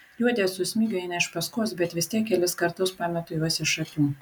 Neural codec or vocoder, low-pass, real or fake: none; 19.8 kHz; real